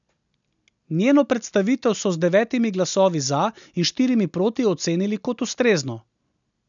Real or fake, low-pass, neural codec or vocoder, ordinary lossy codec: real; 7.2 kHz; none; none